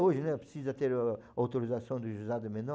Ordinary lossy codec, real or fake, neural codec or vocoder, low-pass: none; real; none; none